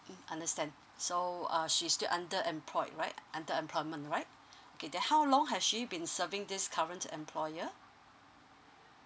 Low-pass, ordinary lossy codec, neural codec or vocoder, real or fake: none; none; none; real